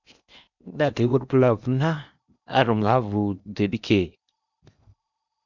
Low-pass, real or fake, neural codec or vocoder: 7.2 kHz; fake; codec, 16 kHz in and 24 kHz out, 0.8 kbps, FocalCodec, streaming, 65536 codes